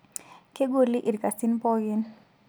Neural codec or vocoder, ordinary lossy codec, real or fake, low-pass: none; none; real; none